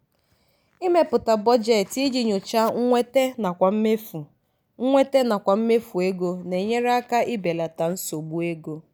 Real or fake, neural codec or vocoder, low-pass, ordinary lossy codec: real; none; none; none